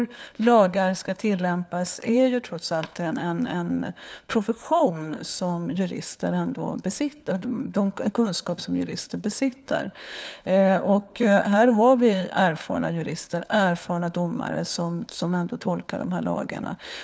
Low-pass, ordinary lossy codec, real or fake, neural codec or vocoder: none; none; fake; codec, 16 kHz, 4 kbps, FunCodec, trained on LibriTTS, 50 frames a second